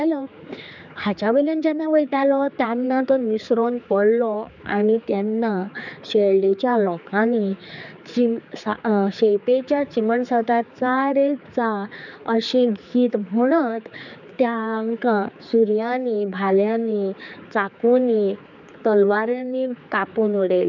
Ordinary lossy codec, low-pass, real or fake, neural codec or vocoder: none; 7.2 kHz; fake; codec, 16 kHz, 4 kbps, X-Codec, HuBERT features, trained on general audio